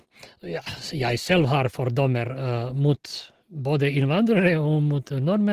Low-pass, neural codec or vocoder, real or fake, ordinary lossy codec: 14.4 kHz; none; real; Opus, 16 kbps